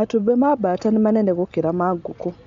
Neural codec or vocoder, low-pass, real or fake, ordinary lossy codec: none; 7.2 kHz; real; MP3, 64 kbps